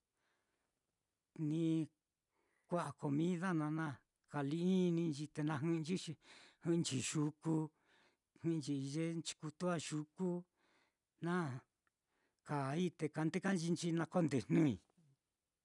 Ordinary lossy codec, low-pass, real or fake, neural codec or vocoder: none; 10.8 kHz; real; none